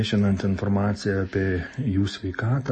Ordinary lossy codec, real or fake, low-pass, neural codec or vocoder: MP3, 32 kbps; real; 10.8 kHz; none